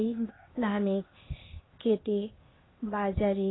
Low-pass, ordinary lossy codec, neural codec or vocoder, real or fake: 7.2 kHz; AAC, 16 kbps; codec, 16 kHz, 0.8 kbps, ZipCodec; fake